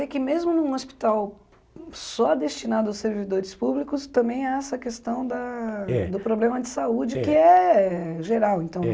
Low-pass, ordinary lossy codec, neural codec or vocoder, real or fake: none; none; none; real